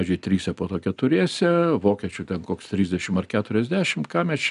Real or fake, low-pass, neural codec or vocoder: real; 10.8 kHz; none